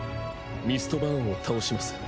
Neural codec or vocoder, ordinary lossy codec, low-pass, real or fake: none; none; none; real